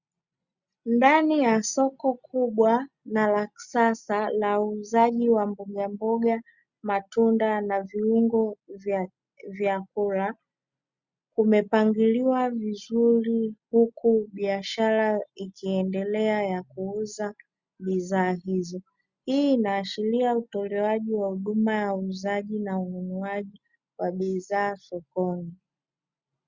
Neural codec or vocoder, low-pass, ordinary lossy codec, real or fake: none; 7.2 kHz; Opus, 64 kbps; real